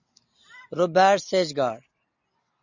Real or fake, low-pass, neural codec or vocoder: real; 7.2 kHz; none